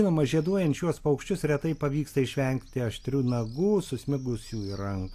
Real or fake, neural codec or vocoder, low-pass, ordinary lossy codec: real; none; 14.4 kHz; AAC, 64 kbps